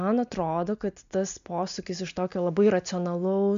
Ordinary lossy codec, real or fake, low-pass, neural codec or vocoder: MP3, 64 kbps; real; 7.2 kHz; none